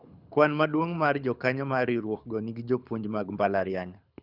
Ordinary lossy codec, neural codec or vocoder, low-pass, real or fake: none; codec, 24 kHz, 6 kbps, HILCodec; 5.4 kHz; fake